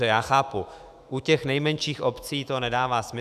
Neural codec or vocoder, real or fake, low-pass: autoencoder, 48 kHz, 128 numbers a frame, DAC-VAE, trained on Japanese speech; fake; 14.4 kHz